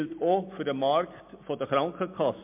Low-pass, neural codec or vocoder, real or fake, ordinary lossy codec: 3.6 kHz; none; real; MP3, 32 kbps